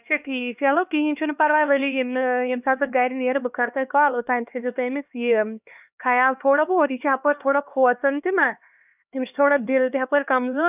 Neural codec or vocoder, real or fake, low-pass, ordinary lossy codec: codec, 16 kHz, 2 kbps, X-Codec, HuBERT features, trained on LibriSpeech; fake; 3.6 kHz; none